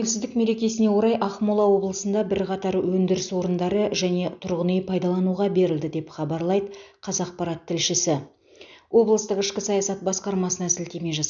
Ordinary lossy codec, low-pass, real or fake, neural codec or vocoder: none; 7.2 kHz; real; none